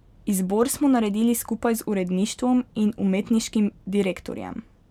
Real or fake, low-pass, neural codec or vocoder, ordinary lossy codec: real; 19.8 kHz; none; none